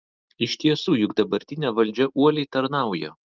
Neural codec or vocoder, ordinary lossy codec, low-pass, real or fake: none; Opus, 32 kbps; 7.2 kHz; real